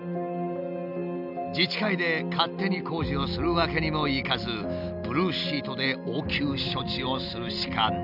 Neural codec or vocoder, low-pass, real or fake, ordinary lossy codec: none; 5.4 kHz; real; none